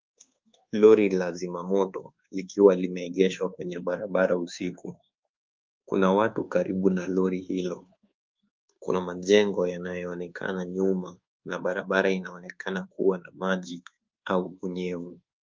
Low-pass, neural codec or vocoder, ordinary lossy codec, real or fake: 7.2 kHz; codec, 24 kHz, 1.2 kbps, DualCodec; Opus, 24 kbps; fake